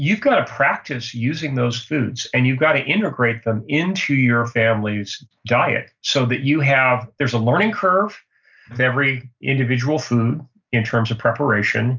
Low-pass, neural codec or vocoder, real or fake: 7.2 kHz; none; real